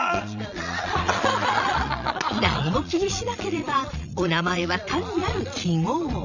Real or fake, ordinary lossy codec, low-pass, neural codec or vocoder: fake; none; 7.2 kHz; vocoder, 22.05 kHz, 80 mel bands, Vocos